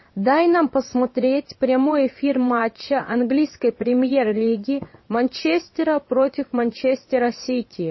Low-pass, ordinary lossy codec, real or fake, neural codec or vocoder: 7.2 kHz; MP3, 24 kbps; fake; vocoder, 22.05 kHz, 80 mel bands, WaveNeXt